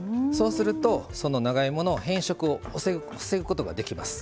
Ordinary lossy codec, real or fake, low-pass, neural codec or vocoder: none; real; none; none